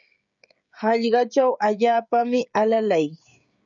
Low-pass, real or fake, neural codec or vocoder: 7.2 kHz; fake; codec, 16 kHz, 16 kbps, FreqCodec, smaller model